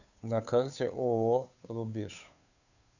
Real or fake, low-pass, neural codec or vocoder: fake; 7.2 kHz; codec, 16 kHz, 2 kbps, FunCodec, trained on Chinese and English, 25 frames a second